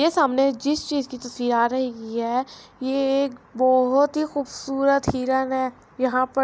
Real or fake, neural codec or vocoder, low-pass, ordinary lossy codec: real; none; none; none